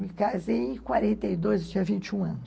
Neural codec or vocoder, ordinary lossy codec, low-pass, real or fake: none; none; none; real